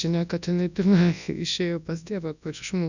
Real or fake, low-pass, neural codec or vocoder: fake; 7.2 kHz; codec, 24 kHz, 0.9 kbps, WavTokenizer, large speech release